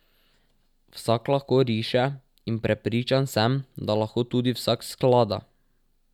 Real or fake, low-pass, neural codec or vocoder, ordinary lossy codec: real; 19.8 kHz; none; none